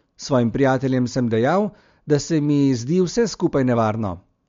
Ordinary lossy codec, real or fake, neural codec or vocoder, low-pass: MP3, 48 kbps; real; none; 7.2 kHz